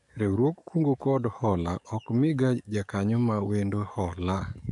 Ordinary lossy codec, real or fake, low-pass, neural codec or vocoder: none; fake; 10.8 kHz; codec, 44.1 kHz, 7.8 kbps, DAC